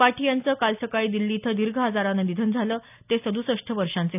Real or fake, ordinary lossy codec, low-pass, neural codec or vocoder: real; none; 3.6 kHz; none